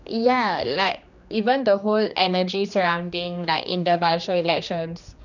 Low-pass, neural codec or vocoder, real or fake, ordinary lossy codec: 7.2 kHz; codec, 16 kHz, 2 kbps, X-Codec, HuBERT features, trained on general audio; fake; none